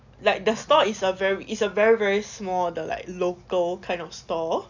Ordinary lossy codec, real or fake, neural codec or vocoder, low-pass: none; real; none; 7.2 kHz